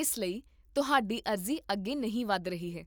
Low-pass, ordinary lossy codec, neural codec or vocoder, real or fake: none; none; none; real